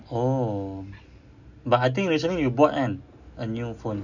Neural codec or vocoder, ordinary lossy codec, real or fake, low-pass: none; none; real; 7.2 kHz